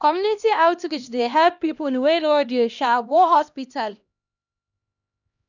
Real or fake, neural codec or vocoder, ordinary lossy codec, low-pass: fake; codec, 16 kHz, 1 kbps, X-Codec, HuBERT features, trained on LibriSpeech; none; 7.2 kHz